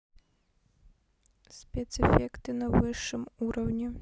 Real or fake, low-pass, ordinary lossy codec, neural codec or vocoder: real; none; none; none